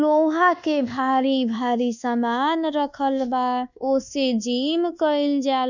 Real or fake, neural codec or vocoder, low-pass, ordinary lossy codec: fake; codec, 24 kHz, 1.2 kbps, DualCodec; 7.2 kHz; none